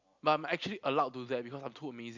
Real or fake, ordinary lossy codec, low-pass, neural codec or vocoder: real; Opus, 64 kbps; 7.2 kHz; none